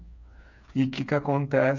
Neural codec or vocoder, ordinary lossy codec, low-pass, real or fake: codec, 16 kHz, 4 kbps, FreqCodec, smaller model; none; 7.2 kHz; fake